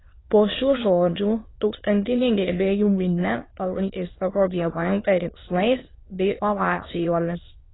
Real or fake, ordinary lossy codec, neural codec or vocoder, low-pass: fake; AAC, 16 kbps; autoencoder, 22.05 kHz, a latent of 192 numbers a frame, VITS, trained on many speakers; 7.2 kHz